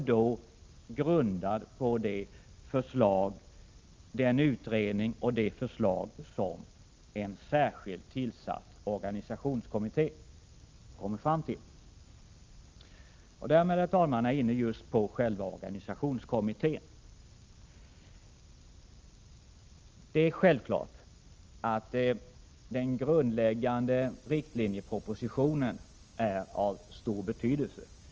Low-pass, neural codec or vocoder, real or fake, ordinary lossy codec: 7.2 kHz; none; real; Opus, 24 kbps